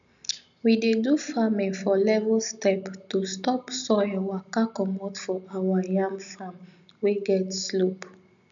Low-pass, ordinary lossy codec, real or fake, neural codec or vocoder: 7.2 kHz; none; real; none